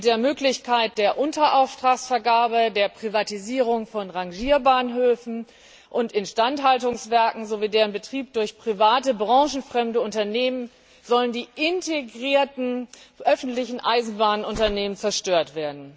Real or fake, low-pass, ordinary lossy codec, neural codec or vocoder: real; none; none; none